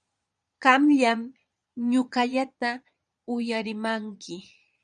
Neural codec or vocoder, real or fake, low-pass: vocoder, 22.05 kHz, 80 mel bands, Vocos; fake; 9.9 kHz